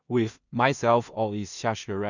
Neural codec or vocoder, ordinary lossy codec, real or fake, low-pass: codec, 16 kHz in and 24 kHz out, 0.4 kbps, LongCat-Audio-Codec, two codebook decoder; none; fake; 7.2 kHz